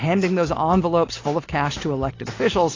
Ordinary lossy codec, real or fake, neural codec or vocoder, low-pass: AAC, 32 kbps; real; none; 7.2 kHz